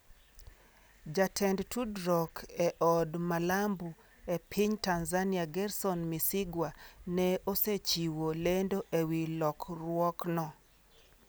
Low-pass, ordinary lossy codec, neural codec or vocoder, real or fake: none; none; none; real